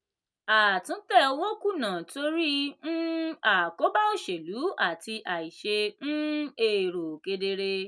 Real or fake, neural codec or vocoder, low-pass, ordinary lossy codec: real; none; none; none